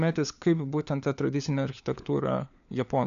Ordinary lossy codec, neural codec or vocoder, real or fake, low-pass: AAC, 96 kbps; codec, 16 kHz, 4 kbps, FunCodec, trained on LibriTTS, 50 frames a second; fake; 7.2 kHz